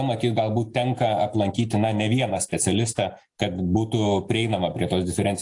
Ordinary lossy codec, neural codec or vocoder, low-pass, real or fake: AAC, 64 kbps; none; 10.8 kHz; real